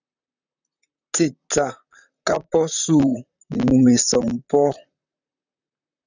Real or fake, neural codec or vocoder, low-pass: fake; vocoder, 44.1 kHz, 128 mel bands, Pupu-Vocoder; 7.2 kHz